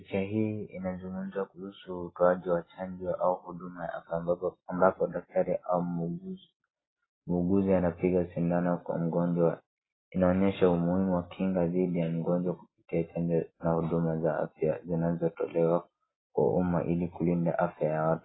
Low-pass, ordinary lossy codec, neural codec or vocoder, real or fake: 7.2 kHz; AAC, 16 kbps; none; real